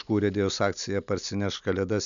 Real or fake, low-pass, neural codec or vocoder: real; 7.2 kHz; none